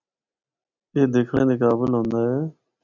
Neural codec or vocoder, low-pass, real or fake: none; 7.2 kHz; real